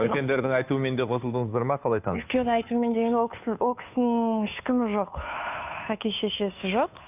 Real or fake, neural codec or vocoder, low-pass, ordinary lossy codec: fake; codec, 16 kHz, 2 kbps, FunCodec, trained on Chinese and English, 25 frames a second; 3.6 kHz; none